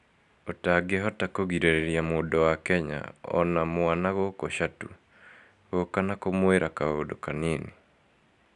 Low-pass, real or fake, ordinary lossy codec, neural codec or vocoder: 10.8 kHz; real; none; none